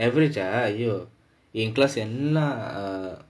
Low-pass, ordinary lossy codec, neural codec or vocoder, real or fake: none; none; none; real